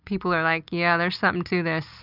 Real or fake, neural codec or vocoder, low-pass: real; none; 5.4 kHz